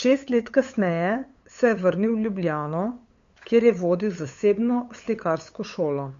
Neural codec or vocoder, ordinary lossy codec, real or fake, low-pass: codec, 16 kHz, 8 kbps, FunCodec, trained on LibriTTS, 25 frames a second; MP3, 48 kbps; fake; 7.2 kHz